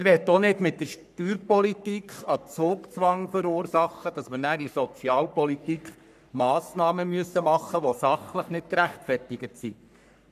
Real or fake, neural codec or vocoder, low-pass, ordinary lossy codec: fake; codec, 44.1 kHz, 3.4 kbps, Pupu-Codec; 14.4 kHz; none